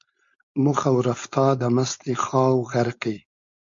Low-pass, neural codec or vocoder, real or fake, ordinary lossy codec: 7.2 kHz; codec, 16 kHz, 4.8 kbps, FACodec; fake; MP3, 48 kbps